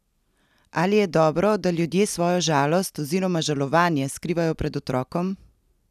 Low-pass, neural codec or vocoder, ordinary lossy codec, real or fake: 14.4 kHz; none; none; real